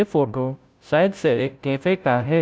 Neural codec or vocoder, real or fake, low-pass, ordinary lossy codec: codec, 16 kHz, 0.5 kbps, FunCodec, trained on Chinese and English, 25 frames a second; fake; none; none